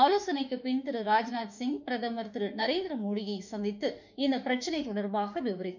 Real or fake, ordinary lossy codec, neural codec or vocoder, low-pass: fake; none; autoencoder, 48 kHz, 32 numbers a frame, DAC-VAE, trained on Japanese speech; 7.2 kHz